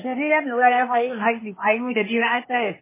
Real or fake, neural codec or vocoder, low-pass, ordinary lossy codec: fake; codec, 16 kHz, 0.8 kbps, ZipCodec; 3.6 kHz; MP3, 16 kbps